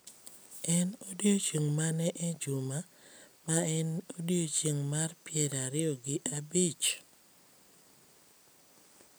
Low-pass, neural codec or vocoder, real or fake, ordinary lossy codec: none; none; real; none